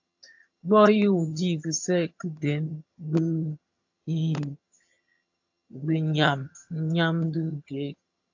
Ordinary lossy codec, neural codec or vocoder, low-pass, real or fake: AAC, 48 kbps; vocoder, 22.05 kHz, 80 mel bands, HiFi-GAN; 7.2 kHz; fake